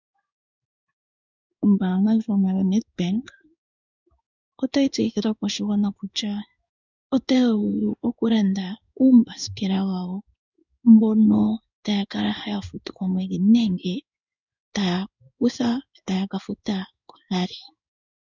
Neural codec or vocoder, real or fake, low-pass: codec, 16 kHz in and 24 kHz out, 1 kbps, XY-Tokenizer; fake; 7.2 kHz